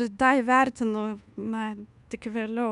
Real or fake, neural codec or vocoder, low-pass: fake; codec, 24 kHz, 1.2 kbps, DualCodec; 10.8 kHz